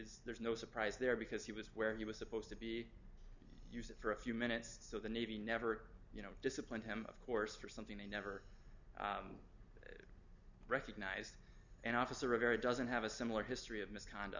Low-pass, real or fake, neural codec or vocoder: 7.2 kHz; real; none